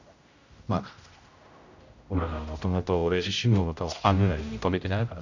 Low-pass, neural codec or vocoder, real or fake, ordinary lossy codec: 7.2 kHz; codec, 16 kHz, 0.5 kbps, X-Codec, HuBERT features, trained on general audio; fake; none